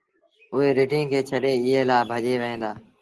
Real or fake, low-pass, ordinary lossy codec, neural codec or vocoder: real; 10.8 kHz; Opus, 16 kbps; none